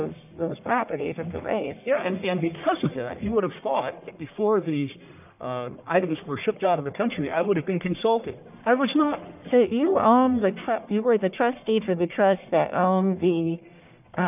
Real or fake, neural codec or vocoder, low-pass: fake; codec, 44.1 kHz, 1.7 kbps, Pupu-Codec; 3.6 kHz